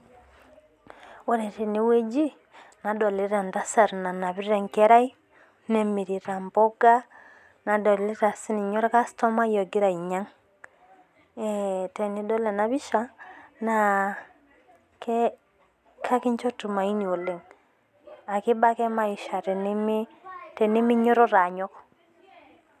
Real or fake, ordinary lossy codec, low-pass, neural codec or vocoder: real; none; 14.4 kHz; none